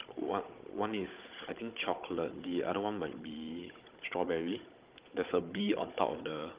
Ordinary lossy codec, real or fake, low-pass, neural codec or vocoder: Opus, 32 kbps; fake; 3.6 kHz; codec, 16 kHz, 16 kbps, FunCodec, trained on Chinese and English, 50 frames a second